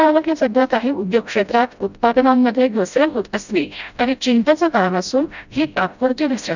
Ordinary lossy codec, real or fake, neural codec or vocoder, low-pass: none; fake; codec, 16 kHz, 0.5 kbps, FreqCodec, smaller model; 7.2 kHz